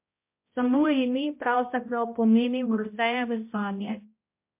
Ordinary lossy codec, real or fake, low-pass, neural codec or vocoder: MP3, 24 kbps; fake; 3.6 kHz; codec, 16 kHz, 0.5 kbps, X-Codec, HuBERT features, trained on balanced general audio